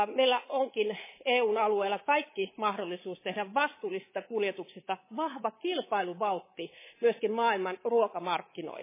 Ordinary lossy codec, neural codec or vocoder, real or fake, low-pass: MP3, 16 kbps; codec, 24 kHz, 3.1 kbps, DualCodec; fake; 3.6 kHz